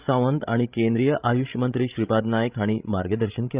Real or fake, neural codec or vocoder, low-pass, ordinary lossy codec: fake; codec, 16 kHz, 16 kbps, FreqCodec, larger model; 3.6 kHz; Opus, 32 kbps